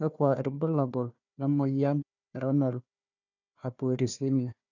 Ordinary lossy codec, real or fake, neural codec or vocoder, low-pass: none; fake; codec, 16 kHz, 1 kbps, FunCodec, trained on Chinese and English, 50 frames a second; 7.2 kHz